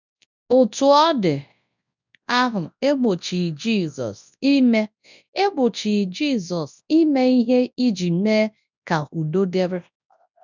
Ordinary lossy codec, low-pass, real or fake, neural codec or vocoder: none; 7.2 kHz; fake; codec, 24 kHz, 0.9 kbps, WavTokenizer, large speech release